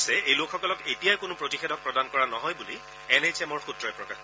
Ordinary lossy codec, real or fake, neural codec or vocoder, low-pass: none; real; none; none